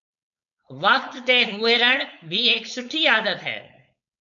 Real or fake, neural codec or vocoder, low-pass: fake; codec, 16 kHz, 4.8 kbps, FACodec; 7.2 kHz